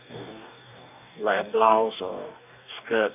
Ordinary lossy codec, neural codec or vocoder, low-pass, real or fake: none; codec, 44.1 kHz, 2.6 kbps, DAC; 3.6 kHz; fake